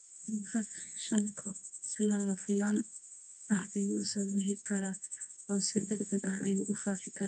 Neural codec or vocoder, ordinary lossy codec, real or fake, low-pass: codec, 24 kHz, 0.9 kbps, WavTokenizer, medium music audio release; none; fake; 10.8 kHz